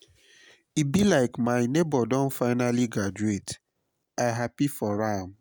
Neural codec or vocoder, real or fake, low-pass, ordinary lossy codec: none; real; none; none